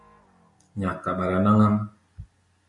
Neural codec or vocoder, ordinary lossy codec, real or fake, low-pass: none; MP3, 64 kbps; real; 10.8 kHz